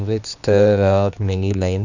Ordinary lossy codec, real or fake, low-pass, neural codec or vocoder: none; fake; 7.2 kHz; codec, 16 kHz, 2 kbps, X-Codec, HuBERT features, trained on general audio